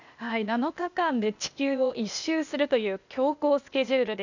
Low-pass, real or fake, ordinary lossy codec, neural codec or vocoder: 7.2 kHz; fake; none; codec, 16 kHz, 0.8 kbps, ZipCodec